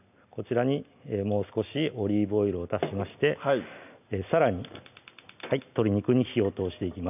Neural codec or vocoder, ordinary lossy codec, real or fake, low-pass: none; none; real; 3.6 kHz